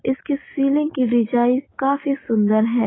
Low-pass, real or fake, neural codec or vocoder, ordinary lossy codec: 7.2 kHz; real; none; AAC, 16 kbps